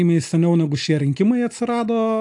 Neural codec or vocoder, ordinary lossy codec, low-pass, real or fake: none; AAC, 64 kbps; 10.8 kHz; real